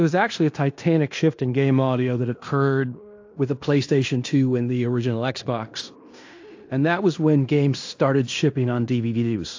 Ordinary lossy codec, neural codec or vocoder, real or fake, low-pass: AAC, 48 kbps; codec, 16 kHz in and 24 kHz out, 0.9 kbps, LongCat-Audio-Codec, fine tuned four codebook decoder; fake; 7.2 kHz